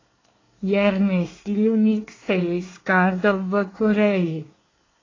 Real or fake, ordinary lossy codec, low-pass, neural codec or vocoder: fake; AAC, 32 kbps; 7.2 kHz; codec, 24 kHz, 1 kbps, SNAC